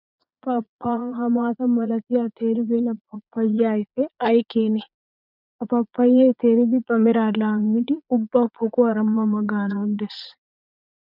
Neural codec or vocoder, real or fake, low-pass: vocoder, 22.05 kHz, 80 mel bands, Vocos; fake; 5.4 kHz